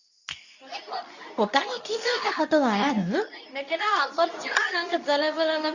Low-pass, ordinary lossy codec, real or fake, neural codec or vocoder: 7.2 kHz; none; fake; codec, 24 kHz, 0.9 kbps, WavTokenizer, medium speech release version 2